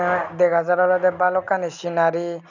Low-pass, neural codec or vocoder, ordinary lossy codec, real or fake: 7.2 kHz; none; none; real